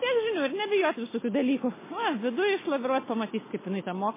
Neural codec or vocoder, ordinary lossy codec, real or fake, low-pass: none; MP3, 16 kbps; real; 3.6 kHz